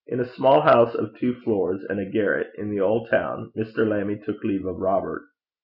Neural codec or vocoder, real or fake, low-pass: none; real; 5.4 kHz